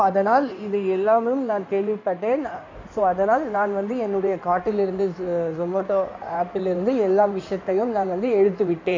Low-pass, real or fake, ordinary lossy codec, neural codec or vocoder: 7.2 kHz; fake; MP3, 48 kbps; codec, 16 kHz in and 24 kHz out, 2.2 kbps, FireRedTTS-2 codec